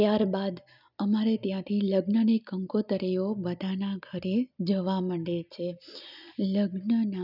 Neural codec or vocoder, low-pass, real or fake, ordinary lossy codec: none; 5.4 kHz; real; none